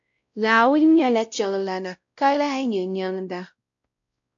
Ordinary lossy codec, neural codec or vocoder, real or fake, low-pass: AAC, 64 kbps; codec, 16 kHz, 0.5 kbps, X-Codec, WavLM features, trained on Multilingual LibriSpeech; fake; 7.2 kHz